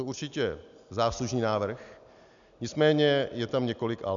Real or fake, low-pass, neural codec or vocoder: real; 7.2 kHz; none